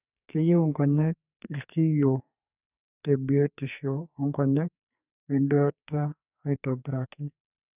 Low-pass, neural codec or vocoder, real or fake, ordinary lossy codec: 3.6 kHz; codec, 44.1 kHz, 2.6 kbps, SNAC; fake; none